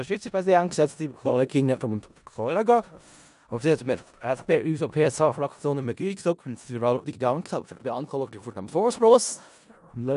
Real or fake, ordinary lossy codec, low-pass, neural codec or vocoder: fake; none; 10.8 kHz; codec, 16 kHz in and 24 kHz out, 0.4 kbps, LongCat-Audio-Codec, four codebook decoder